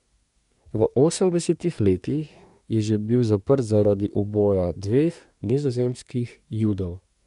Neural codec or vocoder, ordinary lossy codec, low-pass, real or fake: codec, 24 kHz, 1 kbps, SNAC; none; 10.8 kHz; fake